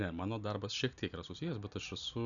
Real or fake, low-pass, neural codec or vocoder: real; 7.2 kHz; none